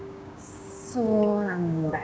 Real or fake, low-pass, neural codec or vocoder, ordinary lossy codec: fake; none; codec, 16 kHz, 6 kbps, DAC; none